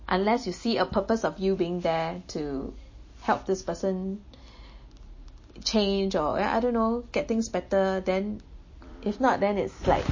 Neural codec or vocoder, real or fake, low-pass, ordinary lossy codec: none; real; 7.2 kHz; MP3, 32 kbps